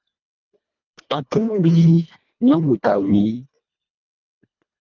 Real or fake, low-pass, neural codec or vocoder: fake; 7.2 kHz; codec, 24 kHz, 1.5 kbps, HILCodec